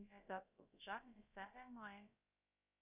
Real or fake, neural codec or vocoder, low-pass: fake; codec, 16 kHz, about 1 kbps, DyCAST, with the encoder's durations; 3.6 kHz